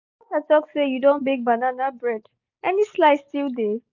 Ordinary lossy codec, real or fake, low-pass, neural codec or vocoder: none; real; 7.2 kHz; none